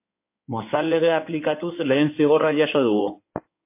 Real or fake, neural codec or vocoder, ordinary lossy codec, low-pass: fake; codec, 16 kHz in and 24 kHz out, 2.2 kbps, FireRedTTS-2 codec; MP3, 24 kbps; 3.6 kHz